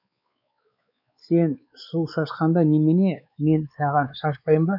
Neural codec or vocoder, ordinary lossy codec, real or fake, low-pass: codec, 16 kHz, 4 kbps, X-Codec, WavLM features, trained on Multilingual LibriSpeech; none; fake; 5.4 kHz